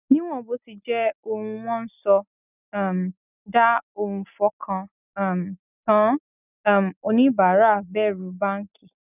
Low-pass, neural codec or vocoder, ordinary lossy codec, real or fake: 3.6 kHz; none; none; real